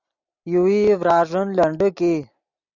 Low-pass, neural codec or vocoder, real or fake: 7.2 kHz; none; real